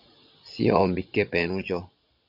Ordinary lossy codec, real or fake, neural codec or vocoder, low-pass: AAC, 48 kbps; fake; vocoder, 22.05 kHz, 80 mel bands, Vocos; 5.4 kHz